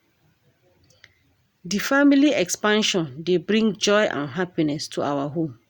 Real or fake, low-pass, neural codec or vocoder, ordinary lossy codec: real; 19.8 kHz; none; Opus, 64 kbps